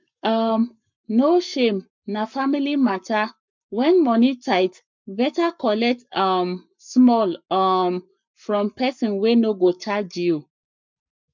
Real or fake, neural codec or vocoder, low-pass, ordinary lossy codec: fake; codec, 44.1 kHz, 7.8 kbps, Pupu-Codec; 7.2 kHz; MP3, 64 kbps